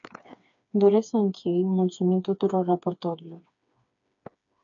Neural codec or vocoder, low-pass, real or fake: codec, 16 kHz, 4 kbps, FreqCodec, smaller model; 7.2 kHz; fake